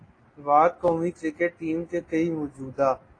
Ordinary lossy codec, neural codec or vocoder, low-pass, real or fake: AAC, 32 kbps; none; 9.9 kHz; real